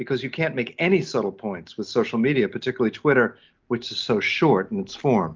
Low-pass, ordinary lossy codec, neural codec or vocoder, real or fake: 7.2 kHz; Opus, 24 kbps; none; real